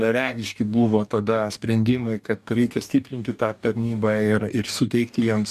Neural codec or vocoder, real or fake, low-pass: codec, 44.1 kHz, 2.6 kbps, DAC; fake; 14.4 kHz